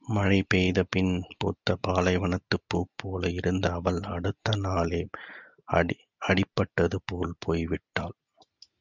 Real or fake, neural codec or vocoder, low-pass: real; none; 7.2 kHz